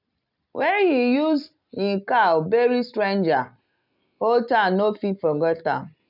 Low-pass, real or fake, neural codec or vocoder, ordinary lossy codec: 5.4 kHz; real; none; none